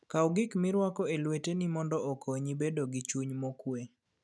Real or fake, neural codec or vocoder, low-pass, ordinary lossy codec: real; none; none; none